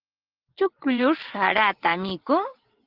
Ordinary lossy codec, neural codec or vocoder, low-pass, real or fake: Opus, 16 kbps; vocoder, 22.05 kHz, 80 mel bands, WaveNeXt; 5.4 kHz; fake